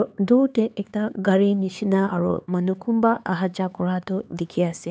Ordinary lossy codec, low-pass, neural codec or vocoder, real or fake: none; none; codec, 16 kHz, 4 kbps, X-Codec, HuBERT features, trained on LibriSpeech; fake